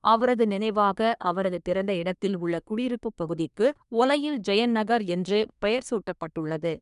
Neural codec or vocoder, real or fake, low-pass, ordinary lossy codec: codec, 24 kHz, 1 kbps, SNAC; fake; 10.8 kHz; none